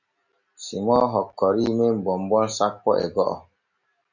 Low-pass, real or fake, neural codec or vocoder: 7.2 kHz; real; none